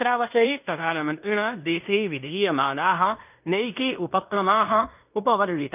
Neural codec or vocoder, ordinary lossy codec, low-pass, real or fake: codec, 16 kHz in and 24 kHz out, 0.9 kbps, LongCat-Audio-Codec, fine tuned four codebook decoder; none; 3.6 kHz; fake